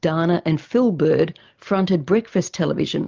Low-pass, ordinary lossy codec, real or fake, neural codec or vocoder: 7.2 kHz; Opus, 32 kbps; real; none